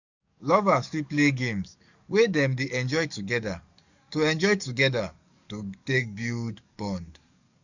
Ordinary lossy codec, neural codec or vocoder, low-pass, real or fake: none; codec, 44.1 kHz, 7.8 kbps, DAC; 7.2 kHz; fake